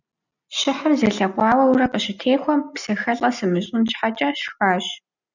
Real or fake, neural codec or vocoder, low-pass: real; none; 7.2 kHz